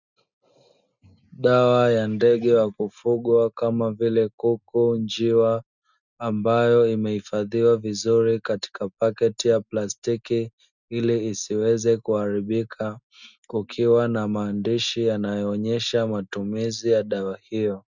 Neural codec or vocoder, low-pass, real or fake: none; 7.2 kHz; real